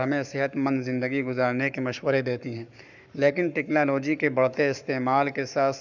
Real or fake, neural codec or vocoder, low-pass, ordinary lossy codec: fake; codec, 44.1 kHz, 7.8 kbps, Pupu-Codec; 7.2 kHz; none